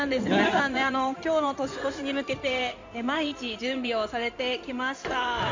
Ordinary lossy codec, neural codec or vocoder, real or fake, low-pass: MP3, 64 kbps; codec, 16 kHz in and 24 kHz out, 2.2 kbps, FireRedTTS-2 codec; fake; 7.2 kHz